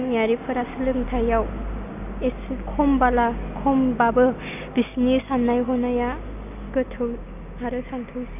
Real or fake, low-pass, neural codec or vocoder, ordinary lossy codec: real; 3.6 kHz; none; none